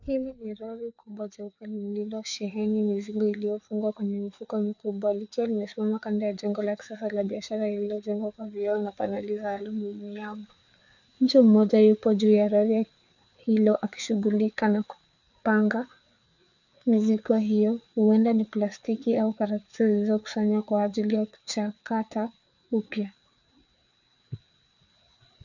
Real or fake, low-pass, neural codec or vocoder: fake; 7.2 kHz; codec, 16 kHz, 4 kbps, FreqCodec, larger model